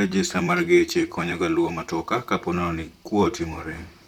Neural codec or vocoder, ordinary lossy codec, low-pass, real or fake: vocoder, 44.1 kHz, 128 mel bands, Pupu-Vocoder; none; 19.8 kHz; fake